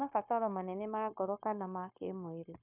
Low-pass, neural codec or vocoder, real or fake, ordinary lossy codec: 3.6 kHz; codec, 16 kHz, 0.9 kbps, LongCat-Audio-Codec; fake; none